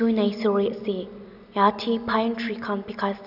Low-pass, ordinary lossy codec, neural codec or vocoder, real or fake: 5.4 kHz; none; none; real